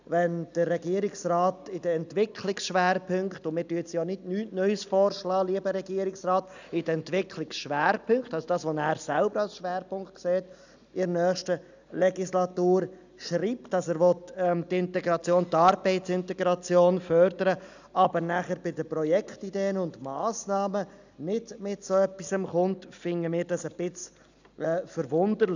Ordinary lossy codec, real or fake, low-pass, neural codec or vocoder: none; real; 7.2 kHz; none